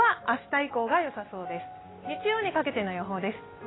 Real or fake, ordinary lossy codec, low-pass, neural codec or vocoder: real; AAC, 16 kbps; 7.2 kHz; none